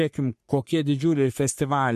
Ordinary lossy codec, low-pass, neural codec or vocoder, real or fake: MP3, 64 kbps; 14.4 kHz; codec, 44.1 kHz, 3.4 kbps, Pupu-Codec; fake